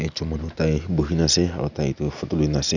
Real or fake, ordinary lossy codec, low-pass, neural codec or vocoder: real; none; 7.2 kHz; none